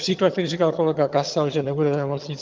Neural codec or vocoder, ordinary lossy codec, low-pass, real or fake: vocoder, 22.05 kHz, 80 mel bands, HiFi-GAN; Opus, 24 kbps; 7.2 kHz; fake